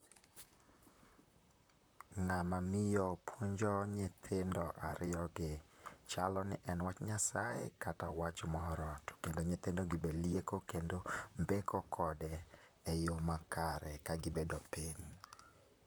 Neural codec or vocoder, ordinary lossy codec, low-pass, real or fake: vocoder, 44.1 kHz, 128 mel bands, Pupu-Vocoder; none; none; fake